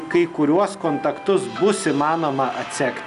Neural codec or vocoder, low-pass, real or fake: none; 10.8 kHz; real